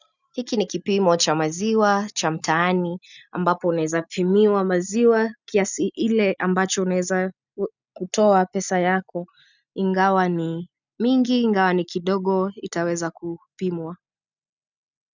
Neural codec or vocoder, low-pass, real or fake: none; 7.2 kHz; real